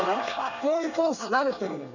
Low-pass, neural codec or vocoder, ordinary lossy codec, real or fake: 7.2 kHz; codec, 24 kHz, 1 kbps, SNAC; none; fake